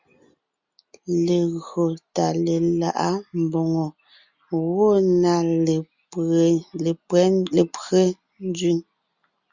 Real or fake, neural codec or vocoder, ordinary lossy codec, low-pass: real; none; Opus, 64 kbps; 7.2 kHz